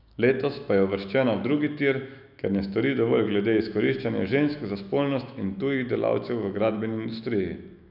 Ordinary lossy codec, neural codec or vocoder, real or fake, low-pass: none; autoencoder, 48 kHz, 128 numbers a frame, DAC-VAE, trained on Japanese speech; fake; 5.4 kHz